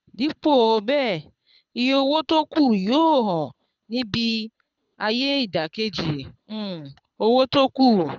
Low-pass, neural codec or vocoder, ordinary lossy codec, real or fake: 7.2 kHz; codec, 24 kHz, 6 kbps, HILCodec; none; fake